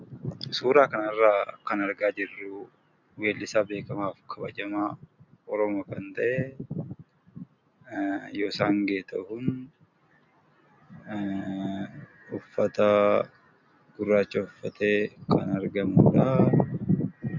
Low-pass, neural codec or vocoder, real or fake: 7.2 kHz; none; real